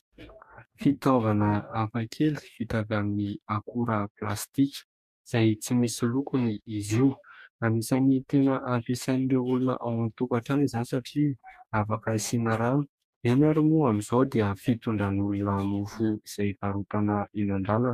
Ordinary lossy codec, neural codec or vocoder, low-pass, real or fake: MP3, 96 kbps; codec, 44.1 kHz, 2.6 kbps, DAC; 14.4 kHz; fake